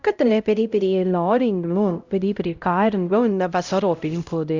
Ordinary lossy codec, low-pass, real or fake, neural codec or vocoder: Opus, 64 kbps; 7.2 kHz; fake; codec, 16 kHz, 0.5 kbps, X-Codec, HuBERT features, trained on LibriSpeech